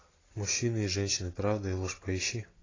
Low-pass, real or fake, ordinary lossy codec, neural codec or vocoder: 7.2 kHz; real; AAC, 32 kbps; none